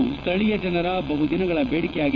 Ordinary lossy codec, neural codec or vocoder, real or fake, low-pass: none; vocoder, 22.05 kHz, 80 mel bands, WaveNeXt; fake; 7.2 kHz